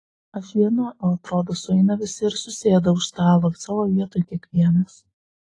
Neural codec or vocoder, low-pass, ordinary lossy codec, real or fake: none; 10.8 kHz; AAC, 32 kbps; real